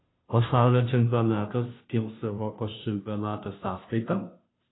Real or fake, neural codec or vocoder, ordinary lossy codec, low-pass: fake; codec, 16 kHz, 0.5 kbps, FunCodec, trained on Chinese and English, 25 frames a second; AAC, 16 kbps; 7.2 kHz